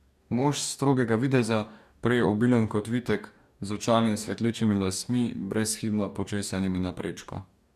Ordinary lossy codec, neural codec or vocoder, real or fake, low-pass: none; codec, 44.1 kHz, 2.6 kbps, DAC; fake; 14.4 kHz